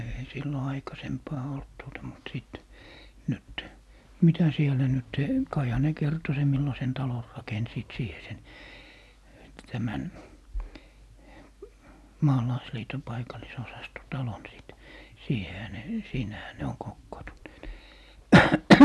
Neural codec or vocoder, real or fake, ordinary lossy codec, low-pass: none; real; none; none